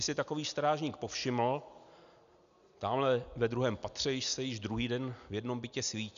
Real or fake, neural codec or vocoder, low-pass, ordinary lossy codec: real; none; 7.2 kHz; MP3, 96 kbps